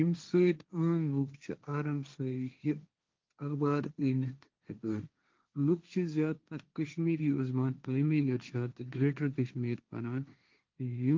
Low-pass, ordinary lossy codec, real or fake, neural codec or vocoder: 7.2 kHz; Opus, 32 kbps; fake; codec, 16 kHz, 1.1 kbps, Voila-Tokenizer